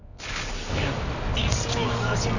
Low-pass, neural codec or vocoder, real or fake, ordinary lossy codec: 7.2 kHz; codec, 24 kHz, 0.9 kbps, WavTokenizer, medium music audio release; fake; none